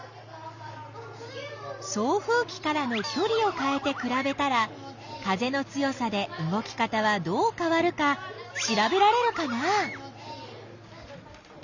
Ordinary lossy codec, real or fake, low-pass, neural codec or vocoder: Opus, 64 kbps; real; 7.2 kHz; none